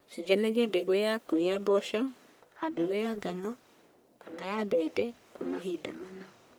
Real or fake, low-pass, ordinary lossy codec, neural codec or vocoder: fake; none; none; codec, 44.1 kHz, 1.7 kbps, Pupu-Codec